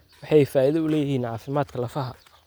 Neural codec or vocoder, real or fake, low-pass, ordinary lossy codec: none; real; none; none